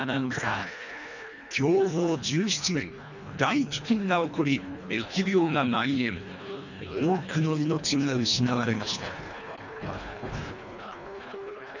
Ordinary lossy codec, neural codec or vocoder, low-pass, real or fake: none; codec, 24 kHz, 1.5 kbps, HILCodec; 7.2 kHz; fake